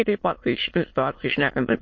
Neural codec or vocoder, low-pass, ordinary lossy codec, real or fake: autoencoder, 22.05 kHz, a latent of 192 numbers a frame, VITS, trained on many speakers; 7.2 kHz; MP3, 32 kbps; fake